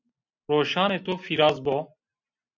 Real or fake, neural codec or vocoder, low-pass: real; none; 7.2 kHz